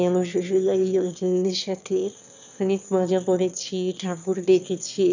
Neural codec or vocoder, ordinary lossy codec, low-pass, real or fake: autoencoder, 22.05 kHz, a latent of 192 numbers a frame, VITS, trained on one speaker; none; 7.2 kHz; fake